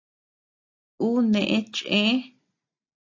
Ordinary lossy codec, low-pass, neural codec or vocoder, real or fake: AAC, 48 kbps; 7.2 kHz; none; real